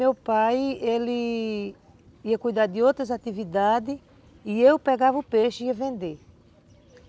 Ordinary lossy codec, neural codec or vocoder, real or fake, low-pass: none; none; real; none